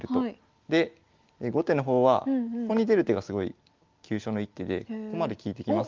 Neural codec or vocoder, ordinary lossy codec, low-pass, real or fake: none; Opus, 32 kbps; 7.2 kHz; real